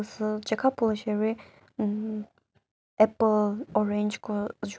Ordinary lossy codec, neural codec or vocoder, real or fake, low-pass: none; none; real; none